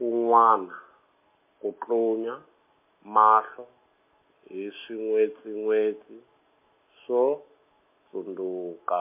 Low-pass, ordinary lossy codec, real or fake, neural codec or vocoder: 3.6 kHz; MP3, 24 kbps; real; none